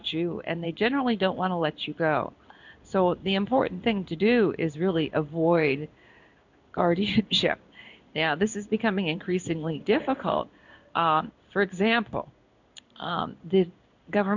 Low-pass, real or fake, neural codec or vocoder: 7.2 kHz; fake; codec, 16 kHz in and 24 kHz out, 1 kbps, XY-Tokenizer